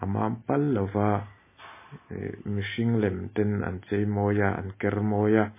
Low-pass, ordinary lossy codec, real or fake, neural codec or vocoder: 3.6 kHz; MP3, 16 kbps; real; none